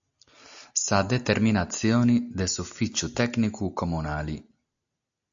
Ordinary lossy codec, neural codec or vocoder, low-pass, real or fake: AAC, 64 kbps; none; 7.2 kHz; real